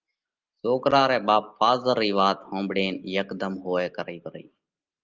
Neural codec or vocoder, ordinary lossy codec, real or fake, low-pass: none; Opus, 24 kbps; real; 7.2 kHz